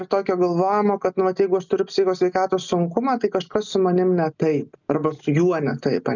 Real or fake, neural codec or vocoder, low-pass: real; none; 7.2 kHz